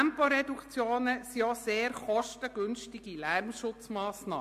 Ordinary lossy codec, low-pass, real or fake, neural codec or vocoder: MP3, 64 kbps; 14.4 kHz; real; none